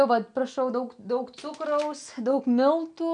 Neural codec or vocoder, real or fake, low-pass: none; real; 9.9 kHz